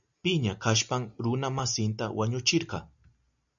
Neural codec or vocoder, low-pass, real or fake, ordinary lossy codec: none; 7.2 kHz; real; MP3, 96 kbps